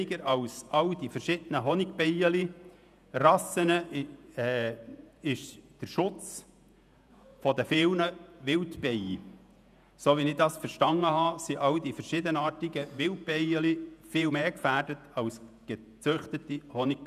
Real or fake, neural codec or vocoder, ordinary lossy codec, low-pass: fake; vocoder, 48 kHz, 128 mel bands, Vocos; none; 14.4 kHz